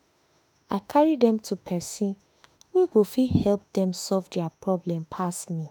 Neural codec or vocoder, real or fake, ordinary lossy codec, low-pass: autoencoder, 48 kHz, 32 numbers a frame, DAC-VAE, trained on Japanese speech; fake; none; none